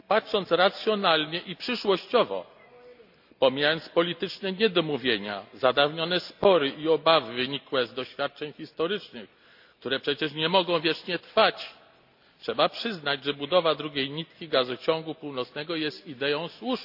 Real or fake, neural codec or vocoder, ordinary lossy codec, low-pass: real; none; AAC, 48 kbps; 5.4 kHz